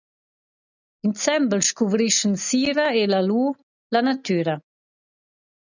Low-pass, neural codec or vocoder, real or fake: 7.2 kHz; none; real